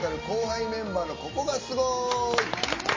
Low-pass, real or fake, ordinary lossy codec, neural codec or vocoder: 7.2 kHz; real; MP3, 32 kbps; none